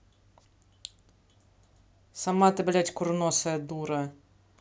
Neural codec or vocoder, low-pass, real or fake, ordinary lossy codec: none; none; real; none